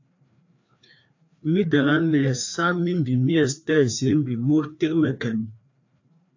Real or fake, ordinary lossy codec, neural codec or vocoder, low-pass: fake; AAC, 48 kbps; codec, 16 kHz, 2 kbps, FreqCodec, larger model; 7.2 kHz